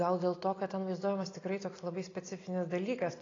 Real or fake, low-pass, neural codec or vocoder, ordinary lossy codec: real; 7.2 kHz; none; AAC, 32 kbps